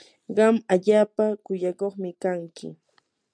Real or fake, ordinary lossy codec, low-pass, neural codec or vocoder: real; MP3, 96 kbps; 9.9 kHz; none